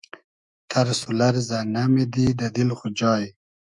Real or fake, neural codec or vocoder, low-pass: fake; autoencoder, 48 kHz, 128 numbers a frame, DAC-VAE, trained on Japanese speech; 10.8 kHz